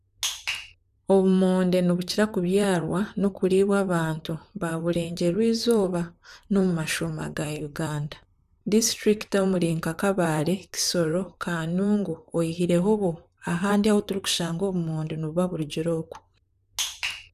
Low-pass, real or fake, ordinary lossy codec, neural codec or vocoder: 14.4 kHz; fake; none; vocoder, 44.1 kHz, 128 mel bands, Pupu-Vocoder